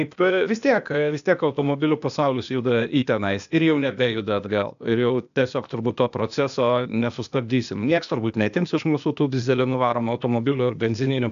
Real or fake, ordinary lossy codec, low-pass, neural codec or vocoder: fake; AAC, 96 kbps; 7.2 kHz; codec, 16 kHz, 0.8 kbps, ZipCodec